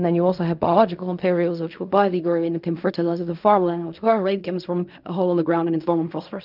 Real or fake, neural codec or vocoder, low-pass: fake; codec, 16 kHz in and 24 kHz out, 0.4 kbps, LongCat-Audio-Codec, fine tuned four codebook decoder; 5.4 kHz